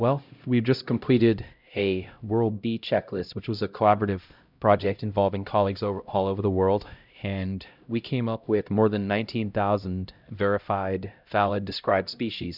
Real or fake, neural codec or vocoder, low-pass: fake; codec, 16 kHz, 0.5 kbps, X-Codec, HuBERT features, trained on LibriSpeech; 5.4 kHz